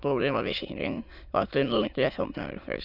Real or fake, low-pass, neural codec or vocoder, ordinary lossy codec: fake; 5.4 kHz; autoencoder, 22.05 kHz, a latent of 192 numbers a frame, VITS, trained on many speakers; none